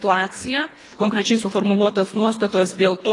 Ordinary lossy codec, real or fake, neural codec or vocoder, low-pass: AAC, 32 kbps; fake; codec, 24 kHz, 1.5 kbps, HILCodec; 10.8 kHz